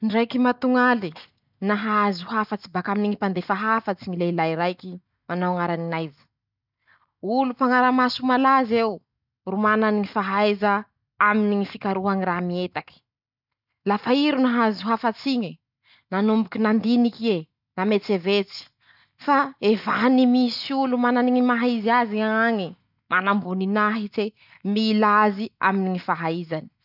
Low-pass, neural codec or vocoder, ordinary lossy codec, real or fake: 5.4 kHz; none; none; real